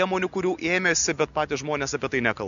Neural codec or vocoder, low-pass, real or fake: none; 7.2 kHz; real